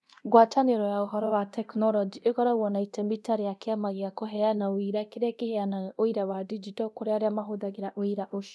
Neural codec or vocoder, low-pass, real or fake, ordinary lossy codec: codec, 24 kHz, 0.9 kbps, DualCodec; none; fake; none